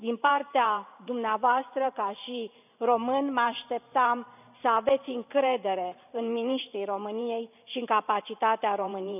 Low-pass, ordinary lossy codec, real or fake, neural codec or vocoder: 3.6 kHz; none; fake; vocoder, 44.1 kHz, 128 mel bands every 512 samples, BigVGAN v2